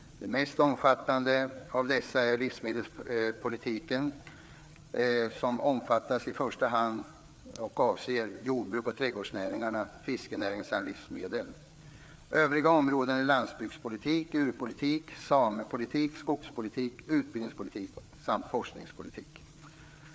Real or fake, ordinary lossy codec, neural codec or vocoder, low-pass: fake; none; codec, 16 kHz, 4 kbps, FreqCodec, larger model; none